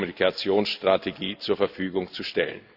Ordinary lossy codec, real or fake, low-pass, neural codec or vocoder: Opus, 64 kbps; real; 5.4 kHz; none